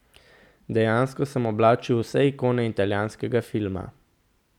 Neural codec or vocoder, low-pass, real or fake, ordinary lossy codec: none; 19.8 kHz; real; none